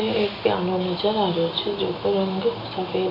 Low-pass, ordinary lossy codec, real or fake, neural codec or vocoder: 5.4 kHz; none; real; none